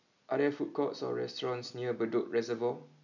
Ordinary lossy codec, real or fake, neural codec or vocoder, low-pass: none; real; none; 7.2 kHz